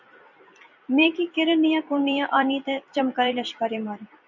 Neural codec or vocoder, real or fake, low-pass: vocoder, 44.1 kHz, 128 mel bands every 256 samples, BigVGAN v2; fake; 7.2 kHz